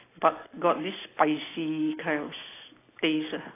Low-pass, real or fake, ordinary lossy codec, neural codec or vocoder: 3.6 kHz; real; AAC, 16 kbps; none